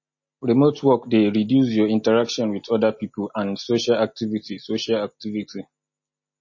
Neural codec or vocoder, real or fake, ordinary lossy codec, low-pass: none; real; MP3, 32 kbps; 7.2 kHz